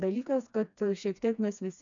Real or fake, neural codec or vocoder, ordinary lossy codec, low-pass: fake; codec, 16 kHz, 2 kbps, FreqCodec, smaller model; MP3, 96 kbps; 7.2 kHz